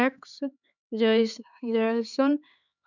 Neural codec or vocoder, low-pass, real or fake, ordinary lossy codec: codec, 16 kHz, 4 kbps, X-Codec, HuBERT features, trained on LibriSpeech; 7.2 kHz; fake; none